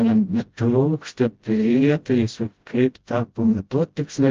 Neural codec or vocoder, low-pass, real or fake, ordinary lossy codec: codec, 16 kHz, 0.5 kbps, FreqCodec, smaller model; 7.2 kHz; fake; Opus, 24 kbps